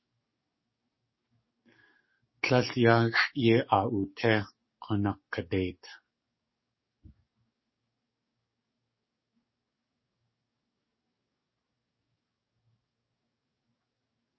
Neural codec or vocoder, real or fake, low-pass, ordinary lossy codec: codec, 44.1 kHz, 7.8 kbps, DAC; fake; 7.2 kHz; MP3, 24 kbps